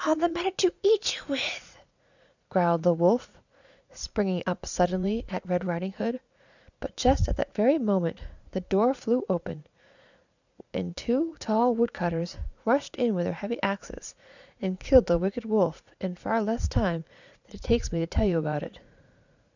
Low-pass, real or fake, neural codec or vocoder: 7.2 kHz; fake; vocoder, 44.1 kHz, 80 mel bands, Vocos